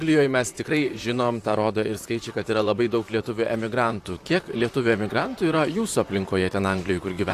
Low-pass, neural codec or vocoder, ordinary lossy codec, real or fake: 14.4 kHz; vocoder, 44.1 kHz, 128 mel bands, Pupu-Vocoder; AAC, 64 kbps; fake